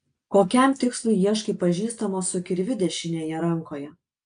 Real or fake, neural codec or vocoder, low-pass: real; none; 9.9 kHz